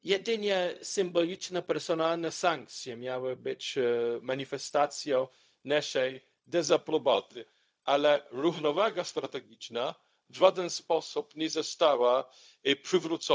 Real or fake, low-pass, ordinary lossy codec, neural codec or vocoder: fake; none; none; codec, 16 kHz, 0.4 kbps, LongCat-Audio-Codec